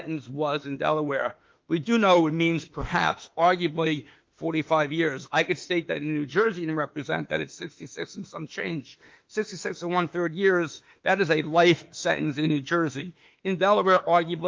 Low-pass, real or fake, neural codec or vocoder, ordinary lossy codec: 7.2 kHz; fake; autoencoder, 48 kHz, 32 numbers a frame, DAC-VAE, trained on Japanese speech; Opus, 32 kbps